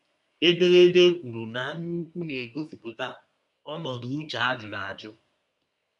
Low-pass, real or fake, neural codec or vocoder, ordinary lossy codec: 10.8 kHz; fake; codec, 24 kHz, 1 kbps, SNAC; none